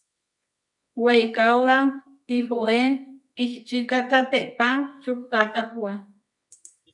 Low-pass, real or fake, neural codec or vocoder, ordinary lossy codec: 10.8 kHz; fake; codec, 24 kHz, 0.9 kbps, WavTokenizer, medium music audio release; MP3, 96 kbps